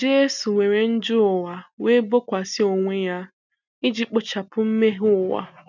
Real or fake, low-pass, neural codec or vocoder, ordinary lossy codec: real; 7.2 kHz; none; none